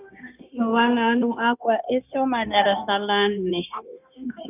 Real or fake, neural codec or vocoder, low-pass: fake; codec, 16 kHz, 0.9 kbps, LongCat-Audio-Codec; 3.6 kHz